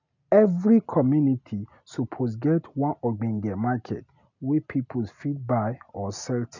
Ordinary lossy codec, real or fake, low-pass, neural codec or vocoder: none; real; 7.2 kHz; none